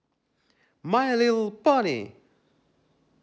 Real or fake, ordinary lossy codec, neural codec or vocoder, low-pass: real; none; none; none